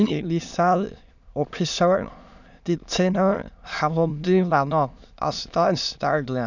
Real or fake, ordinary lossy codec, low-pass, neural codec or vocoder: fake; none; 7.2 kHz; autoencoder, 22.05 kHz, a latent of 192 numbers a frame, VITS, trained on many speakers